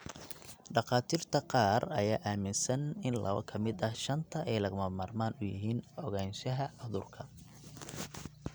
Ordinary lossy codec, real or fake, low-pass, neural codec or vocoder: none; real; none; none